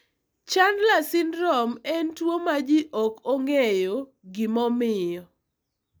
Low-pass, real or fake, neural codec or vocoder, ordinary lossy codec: none; real; none; none